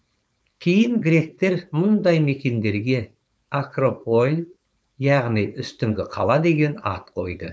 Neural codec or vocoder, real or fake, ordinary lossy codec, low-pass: codec, 16 kHz, 4.8 kbps, FACodec; fake; none; none